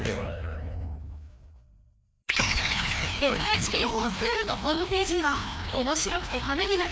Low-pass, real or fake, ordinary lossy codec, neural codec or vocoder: none; fake; none; codec, 16 kHz, 1 kbps, FreqCodec, larger model